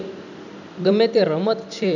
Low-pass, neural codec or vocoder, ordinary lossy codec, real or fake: 7.2 kHz; vocoder, 44.1 kHz, 128 mel bands every 256 samples, BigVGAN v2; none; fake